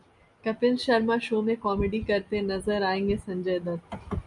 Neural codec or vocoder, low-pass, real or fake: none; 10.8 kHz; real